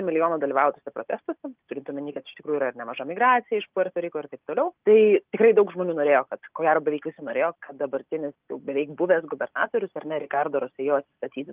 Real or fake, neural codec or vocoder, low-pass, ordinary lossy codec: real; none; 3.6 kHz; Opus, 24 kbps